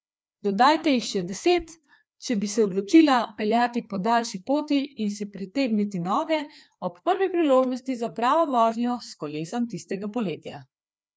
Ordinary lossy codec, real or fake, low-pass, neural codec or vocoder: none; fake; none; codec, 16 kHz, 2 kbps, FreqCodec, larger model